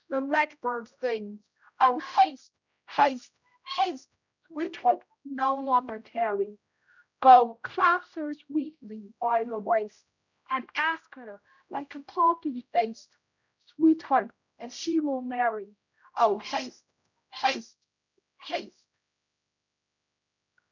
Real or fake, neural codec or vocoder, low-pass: fake; codec, 16 kHz, 0.5 kbps, X-Codec, HuBERT features, trained on general audio; 7.2 kHz